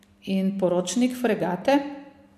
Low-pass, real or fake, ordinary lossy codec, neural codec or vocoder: 14.4 kHz; real; MP3, 64 kbps; none